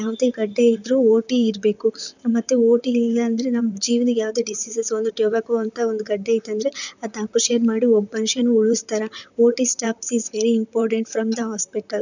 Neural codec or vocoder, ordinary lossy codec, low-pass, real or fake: vocoder, 44.1 kHz, 128 mel bands, Pupu-Vocoder; none; 7.2 kHz; fake